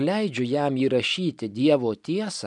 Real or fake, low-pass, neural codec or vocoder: real; 10.8 kHz; none